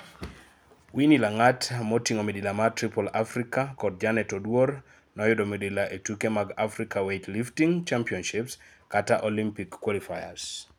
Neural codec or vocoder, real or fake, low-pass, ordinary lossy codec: none; real; none; none